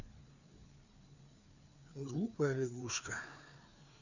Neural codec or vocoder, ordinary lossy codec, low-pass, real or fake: codec, 16 kHz, 4 kbps, FreqCodec, larger model; none; 7.2 kHz; fake